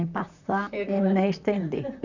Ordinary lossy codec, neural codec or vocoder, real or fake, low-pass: none; vocoder, 44.1 kHz, 128 mel bands, Pupu-Vocoder; fake; 7.2 kHz